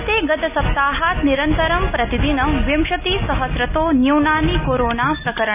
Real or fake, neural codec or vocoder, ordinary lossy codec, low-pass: real; none; none; 3.6 kHz